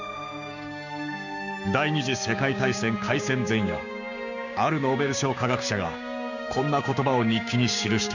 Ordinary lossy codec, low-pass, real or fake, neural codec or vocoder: none; 7.2 kHz; fake; codec, 16 kHz, 6 kbps, DAC